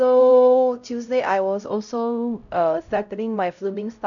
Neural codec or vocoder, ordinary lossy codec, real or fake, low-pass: codec, 16 kHz, 0.5 kbps, X-Codec, HuBERT features, trained on LibriSpeech; none; fake; 7.2 kHz